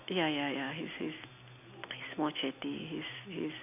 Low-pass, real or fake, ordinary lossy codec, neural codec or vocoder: 3.6 kHz; real; AAC, 32 kbps; none